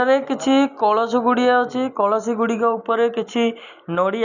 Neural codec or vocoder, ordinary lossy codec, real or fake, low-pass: none; none; real; 7.2 kHz